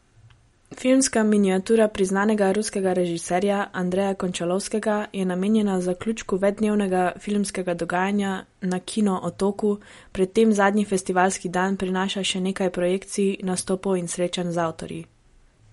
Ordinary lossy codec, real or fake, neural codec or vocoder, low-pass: MP3, 48 kbps; real; none; 19.8 kHz